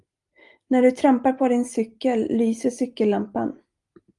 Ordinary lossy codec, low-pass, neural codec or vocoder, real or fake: Opus, 32 kbps; 10.8 kHz; none; real